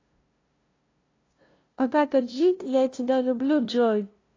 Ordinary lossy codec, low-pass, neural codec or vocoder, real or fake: AAC, 32 kbps; 7.2 kHz; codec, 16 kHz, 0.5 kbps, FunCodec, trained on LibriTTS, 25 frames a second; fake